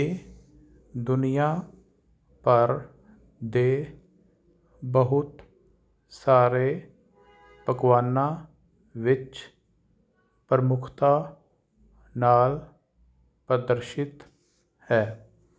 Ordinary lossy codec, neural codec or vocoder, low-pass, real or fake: none; none; none; real